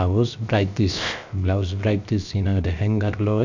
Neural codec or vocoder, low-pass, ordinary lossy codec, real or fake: codec, 16 kHz, 0.7 kbps, FocalCodec; 7.2 kHz; none; fake